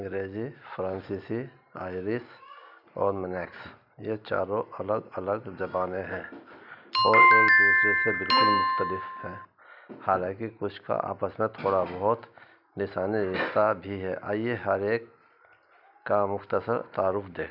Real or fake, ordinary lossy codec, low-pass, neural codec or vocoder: real; none; 5.4 kHz; none